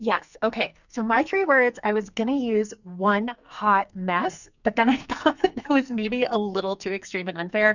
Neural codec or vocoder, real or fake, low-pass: codec, 44.1 kHz, 2.6 kbps, SNAC; fake; 7.2 kHz